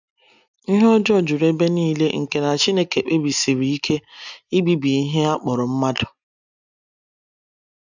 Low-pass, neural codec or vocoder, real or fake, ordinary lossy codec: 7.2 kHz; none; real; none